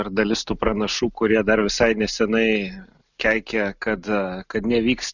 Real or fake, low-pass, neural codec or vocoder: real; 7.2 kHz; none